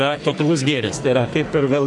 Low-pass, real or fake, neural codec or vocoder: 10.8 kHz; fake; codec, 44.1 kHz, 2.6 kbps, SNAC